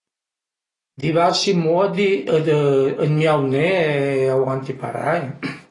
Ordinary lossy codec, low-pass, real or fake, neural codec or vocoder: AAC, 32 kbps; 10.8 kHz; real; none